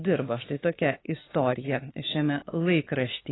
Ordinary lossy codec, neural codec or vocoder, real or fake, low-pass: AAC, 16 kbps; autoencoder, 48 kHz, 32 numbers a frame, DAC-VAE, trained on Japanese speech; fake; 7.2 kHz